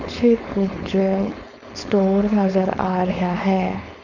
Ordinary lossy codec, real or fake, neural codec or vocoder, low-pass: none; fake; codec, 16 kHz, 4.8 kbps, FACodec; 7.2 kHz